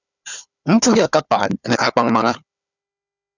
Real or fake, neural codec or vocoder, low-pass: fake; codec, 16 kHz, 4 kbps, FunCodec, trained on Chinese and English, 50 frames a second; 7.2 kHz